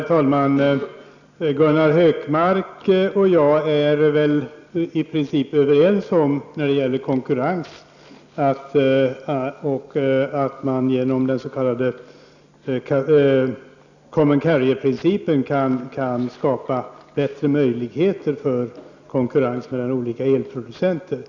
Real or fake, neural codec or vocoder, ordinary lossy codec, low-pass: real; none; none; 7.2 kHz